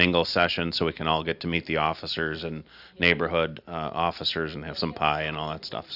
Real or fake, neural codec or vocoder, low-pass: real; none; 5.4 kHz